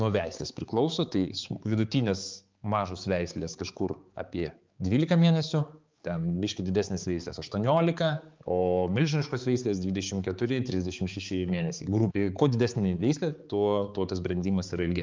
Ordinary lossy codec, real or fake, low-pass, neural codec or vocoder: Opus, 24 kbps; fake; 7.2 kHz; codec, 16 kHz, 4 kbps, X-Codec, HuBERT features, trained on balanced general audio